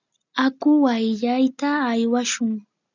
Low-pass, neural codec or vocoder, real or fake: 7.2 kHz; none; real